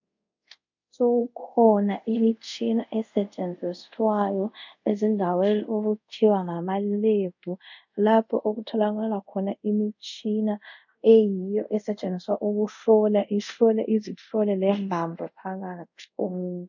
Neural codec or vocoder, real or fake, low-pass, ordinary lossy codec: codec, 24 kHz, 0.5 kbps, DualCodec; fake; 7.2 kHz; MP3, 64 kbps